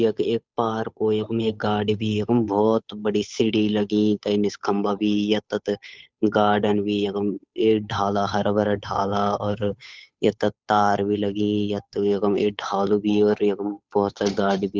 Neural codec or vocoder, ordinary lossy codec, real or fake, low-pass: codec, 16 kHz, 8 kbps, FunCodec, trained on Chinese and English, 25 frames a second; none; fake; none